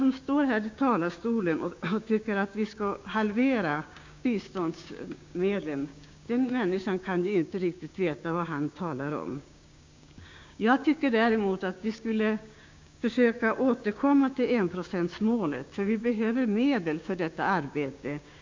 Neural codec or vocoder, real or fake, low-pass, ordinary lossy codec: codec, 16 kHz, 6 kbps, DAC; fake; 7.2 kHz; MP3, 64 kbps